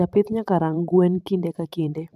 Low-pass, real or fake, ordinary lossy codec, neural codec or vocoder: 14.4 kHz; fake; none; vocoder, 44.1 kHz, 128 mel bands every 512 samples, BigVGAN v2